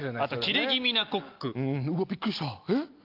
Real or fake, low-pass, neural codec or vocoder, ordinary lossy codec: real; 5.4 kHz; none; Opus, 24 kbps